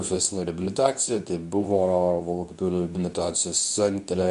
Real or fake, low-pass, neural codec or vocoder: fake; 10.8 kHz; codec, 24 kHz, 0.9 kbps, WavTokenizer, medium speech release version 2